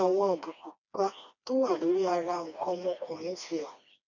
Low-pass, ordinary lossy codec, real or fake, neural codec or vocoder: 7.2 kHz; none; fake; codec, 16 kHz, 2 kbps, FreqCodec, smaller model